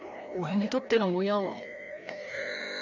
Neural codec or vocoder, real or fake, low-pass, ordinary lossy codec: codec, 16 kHz, 1 kbps, FreqCodec, larger model; fake; 7.2 kHz; none